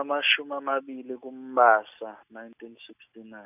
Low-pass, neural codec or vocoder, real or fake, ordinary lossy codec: 3.6 kHz; none; real; none